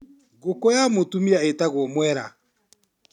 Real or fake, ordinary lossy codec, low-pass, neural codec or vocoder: real; none; 19.8 kHz; none